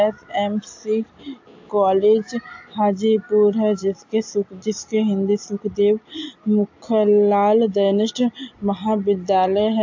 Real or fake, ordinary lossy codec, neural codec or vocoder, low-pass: real; AAC, 48 kbps; none; 7.2 kHz